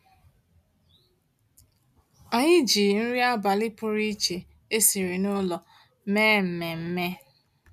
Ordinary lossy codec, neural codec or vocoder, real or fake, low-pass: none; none; real; 14.4 kHz